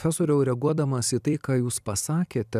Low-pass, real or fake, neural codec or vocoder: 14.4 kHz; fake; vocoder, 44.1 kHz, 128 mel bands every 256 samples, BigVGAN v2